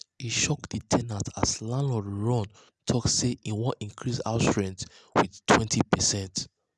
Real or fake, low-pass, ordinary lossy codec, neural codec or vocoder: real; none; none; none